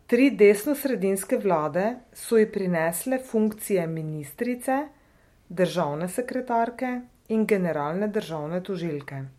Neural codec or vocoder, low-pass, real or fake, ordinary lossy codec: none; 19.8 kHz; real; MP3, 64 kbps